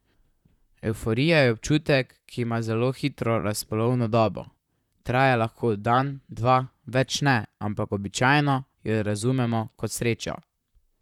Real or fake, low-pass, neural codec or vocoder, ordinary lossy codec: fake; 19.8 kHz; vocoder, 44.1 kHz, 128 mel bands, Pupu-Vocoder; none